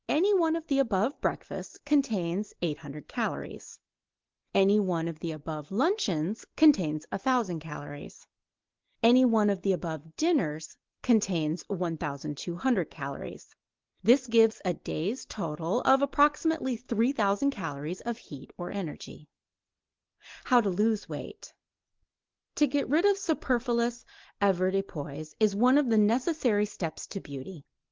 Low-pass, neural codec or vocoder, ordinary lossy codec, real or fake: 7.2 kHz; none; Opus, 16 kbps; real